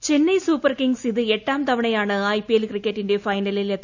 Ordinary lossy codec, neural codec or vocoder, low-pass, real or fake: none; none; 7.2 kHz; real